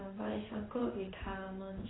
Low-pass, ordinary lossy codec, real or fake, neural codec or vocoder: 7.2 kHz; AAC, 16 kbps; fake; codec, 16 kHz in and 24 kHz out, 1 kbps, XY-Tokenizer